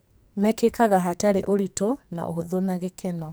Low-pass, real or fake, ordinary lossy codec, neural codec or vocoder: none; fake; none; codec, 44.1 kHz, 2.6 kbps, SNAC